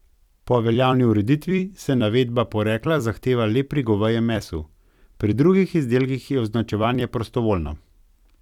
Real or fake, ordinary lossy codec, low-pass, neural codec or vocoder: fake; none; 19.8 kHz; vocoder, 44.1 kHz, 128 mel bands every 256 samples, BigVGAN v2